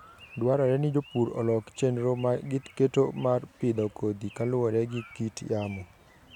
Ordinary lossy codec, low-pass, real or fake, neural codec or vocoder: none; 19.8 kHz; real; none